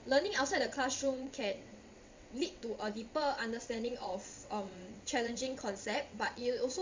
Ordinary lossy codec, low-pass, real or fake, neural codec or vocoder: MP3, 64 kbps; 7.2 kHz; fake; vocoder, 22.05 kHz, 80 mel bands, WaveNeXt